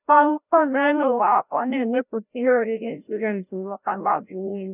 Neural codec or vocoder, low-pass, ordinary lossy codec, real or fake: codec, 16 kHz, 0.5 kbps, FreqCodec, larger model; 3.6 kHz; none; fake